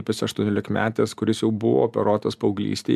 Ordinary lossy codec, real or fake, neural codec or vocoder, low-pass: MP3, 96 kbps; real; none; 14.4 kHz